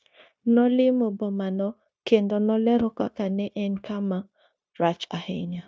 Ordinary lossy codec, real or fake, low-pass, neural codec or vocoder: none; fake; none; codec, 16 kHz, 0.9 kbps, LongCat-Audio-Codec